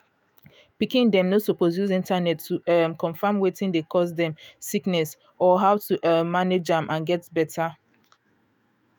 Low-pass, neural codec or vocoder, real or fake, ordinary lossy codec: none; autoencoder, 48 kHz, 128 numbers a frame, DAC-VAE, trained on Japanese speech; fake; none